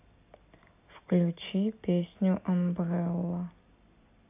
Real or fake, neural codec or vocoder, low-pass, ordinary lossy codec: real; none; 3.6 kHz; AAC, 24 kbps